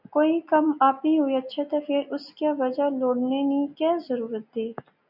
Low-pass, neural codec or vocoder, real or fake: 5.4 kHz; none; real